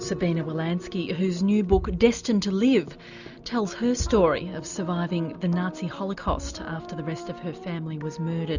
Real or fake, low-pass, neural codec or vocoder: real; 7.2 kHz; none